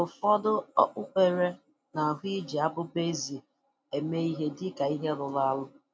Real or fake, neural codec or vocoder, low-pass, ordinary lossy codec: real; none; none; none